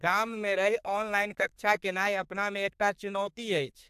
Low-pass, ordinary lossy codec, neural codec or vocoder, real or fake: 14.4 kHz; AAC, 96 kbps; codec, 32 kHz, 1.9 kbps, SNAC; fake